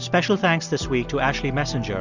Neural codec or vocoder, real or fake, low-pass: none; real; 7.2 kHz